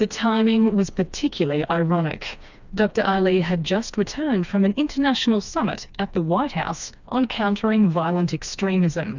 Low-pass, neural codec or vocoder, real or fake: 7.2 kHz; codec, 16 kHz, 2 kbps, FreqCodec, smaller model; fake